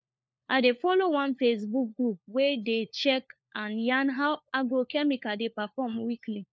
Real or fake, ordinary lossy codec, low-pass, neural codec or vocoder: fake; none; none; codec, 16 kHz, 4 kbps, FunCodec, trained on LibriTTS, 50 frames a second